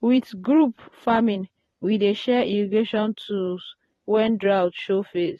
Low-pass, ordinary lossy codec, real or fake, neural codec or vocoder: 19.8 kHz; AAC, 32 kbps; fake; vocoder, 44.1 kHz, 128 mel bands every 512 samples, BigVGAN v2